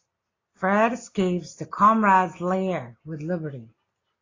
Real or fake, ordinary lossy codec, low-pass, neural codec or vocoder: real; AAC, 32 kbps; 7.2 kHz; none